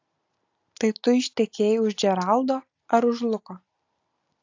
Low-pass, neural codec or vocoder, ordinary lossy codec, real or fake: 7.2 kHz; none; AAC, 48 kbps; real